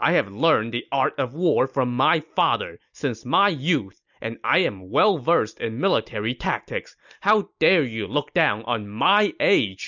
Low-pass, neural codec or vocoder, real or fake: 7.2 kHz; none; real